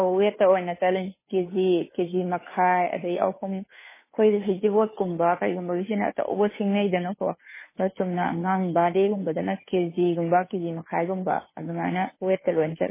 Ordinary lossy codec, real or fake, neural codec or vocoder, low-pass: MP3, 16 kbps; fake; codec, 16 kHz, 2 kbps, FunCodec, trained on Chinese and English, 25 frames a second; 3.6 kHz